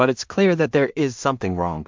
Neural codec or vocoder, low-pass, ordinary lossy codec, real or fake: codec, 16 kHz in and 24 kHz out, 0.4 kbps, LongCat-Audio-Codec, two codebook decoder; 7.2 kHz; MP3, 64 kbps; fake